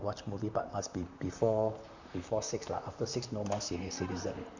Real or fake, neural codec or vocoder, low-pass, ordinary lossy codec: fake; vocoder, 44.1 kHz, 128 mel bands, Pupu-Vocoder; 7.2 kHz; Opus, 64 kbps